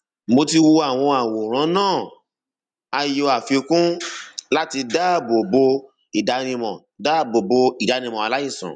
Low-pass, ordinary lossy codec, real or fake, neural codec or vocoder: 9.9 kHz; none; real; none